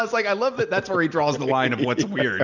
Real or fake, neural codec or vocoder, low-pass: real; none; 7.2 kHz